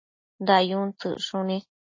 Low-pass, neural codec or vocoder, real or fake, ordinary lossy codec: 7.2 kHz; none; real; MP3, 32 kbps